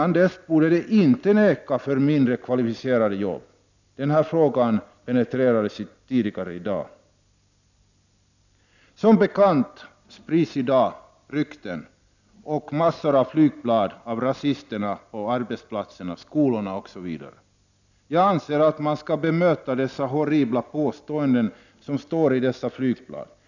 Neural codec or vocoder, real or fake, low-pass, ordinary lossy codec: none; real; 7.2 kHz; none